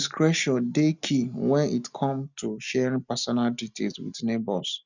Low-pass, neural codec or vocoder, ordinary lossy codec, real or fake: 7.2 kHz; none; none; real